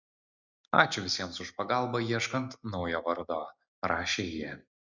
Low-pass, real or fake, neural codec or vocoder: 7.2 kHz; real; none